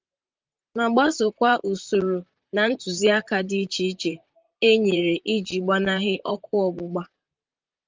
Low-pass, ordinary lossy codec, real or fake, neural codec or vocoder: 7.2 kHz; Opus, 24 kbps; fake; vocoder, 44.1 kHz, 128 mel bands, Pupu-Vocoder